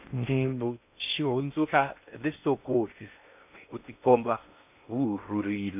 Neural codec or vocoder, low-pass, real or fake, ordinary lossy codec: codec, 16 kHz in and 24 kHz out, 0.6 kbps, FocalCodec, streaming, 2048 codes; 3.6 kHz; fake; none